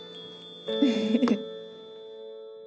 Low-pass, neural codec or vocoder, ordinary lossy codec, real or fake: none; none; none; real